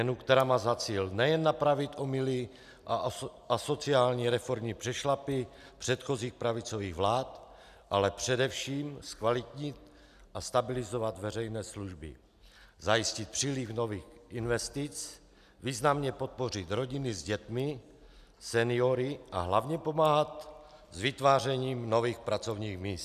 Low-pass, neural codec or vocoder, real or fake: 14.4 kHz; none; real